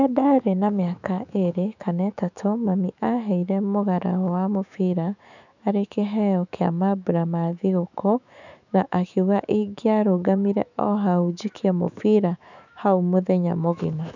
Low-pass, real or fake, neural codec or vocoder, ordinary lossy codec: 7.2 kHz; fake; autoencoder, 48 kHz, 128 numbers a frame, DAC-VAE, trained on Japanese speech; none